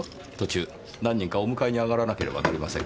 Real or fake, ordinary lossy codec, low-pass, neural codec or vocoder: real; none; none; none